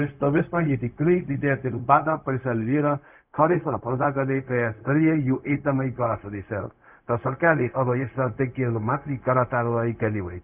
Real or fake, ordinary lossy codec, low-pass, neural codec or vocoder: fake; none; 3.6 kHz; codec, 16 kHz, 0.4 kbps, LongCat-Audio-Codec